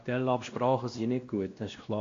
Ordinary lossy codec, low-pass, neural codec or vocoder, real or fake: AAC, 48 kbps; 7.2 kHz; codec, 16 kHz, 1 kbps, X-Codec, WavLM features, trained on Multilingual LibriSpeech; fake